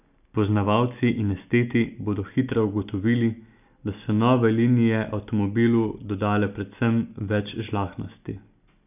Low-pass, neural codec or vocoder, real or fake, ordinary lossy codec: 3.6 kHz; none; real; none